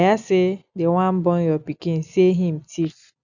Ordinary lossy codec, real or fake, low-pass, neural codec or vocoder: none; real; 7.2 kHz; none